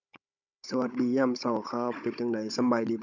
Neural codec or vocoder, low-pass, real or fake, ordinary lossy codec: codec, 16 kHz, 16 kbps, FunCodec, trained on Chinese and English, 50 frames a second; 7.2 kHz; fake; none